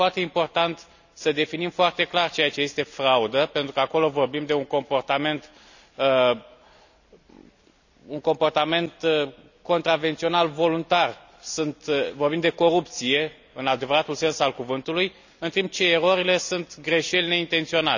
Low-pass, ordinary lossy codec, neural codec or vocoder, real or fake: 7.2 kHz; none; none; real